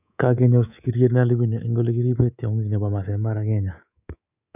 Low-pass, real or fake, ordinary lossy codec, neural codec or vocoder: 3.6 kHz; fake; none; codec, 24 kHz, 3.1 kbps, DualCodec